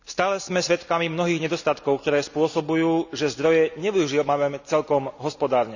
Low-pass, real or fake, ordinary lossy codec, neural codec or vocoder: 7.2 kHz; real; none; none